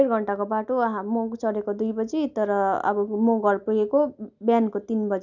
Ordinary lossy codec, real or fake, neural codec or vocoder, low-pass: none; real; none; 7.2 kHz